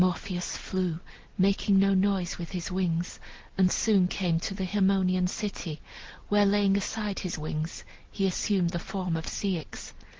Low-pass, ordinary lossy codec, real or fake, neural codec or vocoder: 7.2 kHz; Opus, 16 kbps; real; none